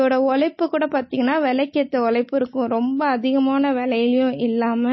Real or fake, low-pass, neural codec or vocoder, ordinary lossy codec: real; 7.2 kHz; none; MP3, 24 kbps